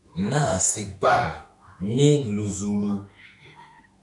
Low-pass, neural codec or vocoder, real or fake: 10.8 kHz; autoencoder, 48 kHz, 32 numbers a frame, DAC-VAE, trained on Japanese speech; fake